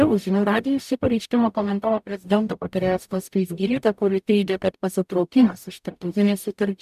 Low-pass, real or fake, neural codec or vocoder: 14.4 kHz; fake; codec, 44.1 kHz, 0.9 kbps, DAC